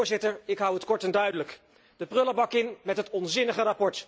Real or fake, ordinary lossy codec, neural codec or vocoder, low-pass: real; none; none; none